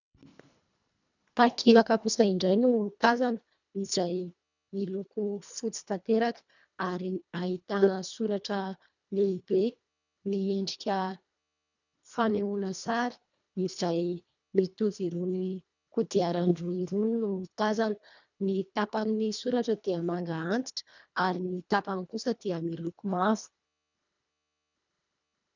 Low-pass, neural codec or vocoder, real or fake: 7.2 kHz; codec, 24 kHz, 1.5 kbps, HILCodec; fake